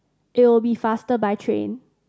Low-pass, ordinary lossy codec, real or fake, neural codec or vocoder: none; none; real; none